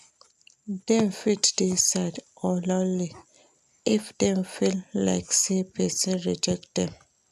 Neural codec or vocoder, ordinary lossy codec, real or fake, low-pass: none; none; real; 14.4 kHz